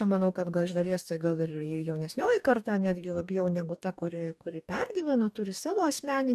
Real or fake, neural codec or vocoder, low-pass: fake; codec, 44.1 kHz, 2.6 kbps, DAC; 14.4 kHz